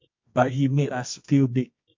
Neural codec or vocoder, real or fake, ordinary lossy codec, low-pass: codec, 24 kHz, 0.9 kbps, WavTokenizer, medium music audio release; fake; MP3, 48 kbps; 7.2 kHz